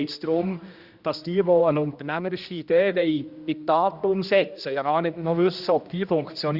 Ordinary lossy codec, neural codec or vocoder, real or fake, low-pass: Opus, 64 kbps; codec, 16 kHz, 1 kbps, X-Codec, HuBERT features, trained on general audio; fake; 5.4 kHz